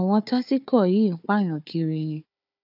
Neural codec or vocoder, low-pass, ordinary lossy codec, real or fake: codec, 16 kHz, 4 kbps, FunCodec, trained on Chinese and English, 50 frames a second; 5.4 kHz; none; fake